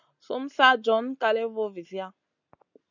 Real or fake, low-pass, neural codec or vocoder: real; 7.2 kHz; none